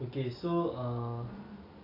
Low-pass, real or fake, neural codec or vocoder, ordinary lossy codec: 5.4 kHz; real; none; AAC, 24 kbps